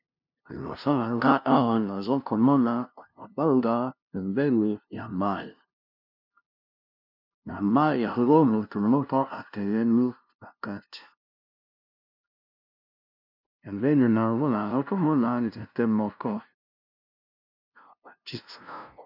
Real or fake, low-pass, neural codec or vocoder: fake; 5.4 kHz; codec, 16 kHz, 0.5 kbps, FunCodec, trained on LibriTTS, 25 frames a second